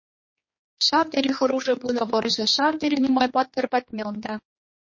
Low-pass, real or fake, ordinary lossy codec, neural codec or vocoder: 7.2 kHz; fake; MP3, 32 kbps; codec, 16 kHz, 2 kbps, X-Codec, HuBERT features, trained on general audio